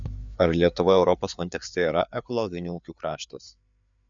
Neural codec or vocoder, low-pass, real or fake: codec, 16 kHz, 4 kbps, FreqCodec, larger model; 7.2 kHz; fake